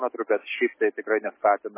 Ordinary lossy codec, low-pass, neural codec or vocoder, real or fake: MP3, 16 kbps; 3.6 kHz; none; real